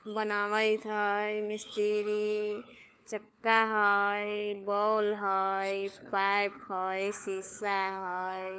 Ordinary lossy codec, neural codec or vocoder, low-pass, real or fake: none; codec, 16 kHz, 4 kbps, FunCodec, trained on LibriTTS, 50 frames a second; none; fake